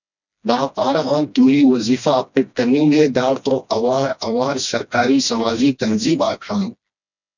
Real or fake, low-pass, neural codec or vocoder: fake; 7.2 kHz; codec, 16 kHz, 1 kbps, FreqCodec, smaller model